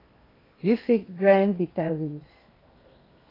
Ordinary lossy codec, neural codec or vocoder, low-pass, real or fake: AAC, 24 kbps; codec, 16 kHz in and 24 kHz out, 0.8 kbps, FocalCodec, streaming, 65536 codes; 5.4 kHz; fake